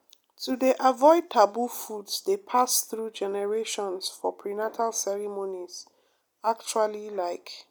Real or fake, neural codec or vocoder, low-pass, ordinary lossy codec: real; none; none; none